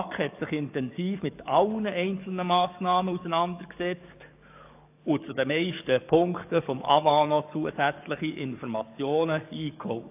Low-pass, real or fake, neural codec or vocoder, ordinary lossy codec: 3.6 kHz; fake; codec, 44.1 kHz, 7.8 kbps, DAC; AAC, 32 kbps